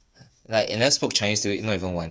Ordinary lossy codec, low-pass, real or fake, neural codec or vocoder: none; none; fake; codec, 16 kHz, 8 kbps, FreqCodec, smaller model